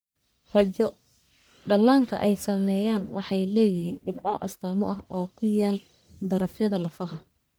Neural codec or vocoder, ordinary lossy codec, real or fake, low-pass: codec, 44.1 kHz, 1.7 kbps, Pupu-Codec; none; fake; none